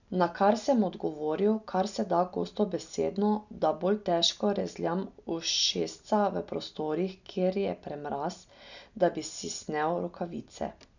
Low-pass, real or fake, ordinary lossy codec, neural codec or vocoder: 7.2 kHz; real; none; none